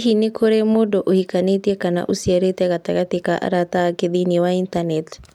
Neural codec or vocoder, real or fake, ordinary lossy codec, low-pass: none; real; none; 19.8 kHz